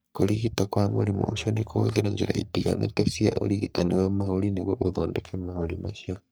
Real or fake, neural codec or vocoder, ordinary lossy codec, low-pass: fake; codec, 44.1 kHz, 3.4 kbps, Pupu-Codec; none; none